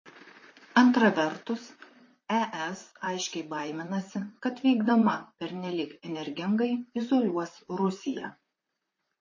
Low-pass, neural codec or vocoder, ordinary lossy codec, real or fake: 7.2 kHz; vocoder, 44.1 kHz, 128 mel bands, Pupu-Vocoder; MP3, 32 kbps; fake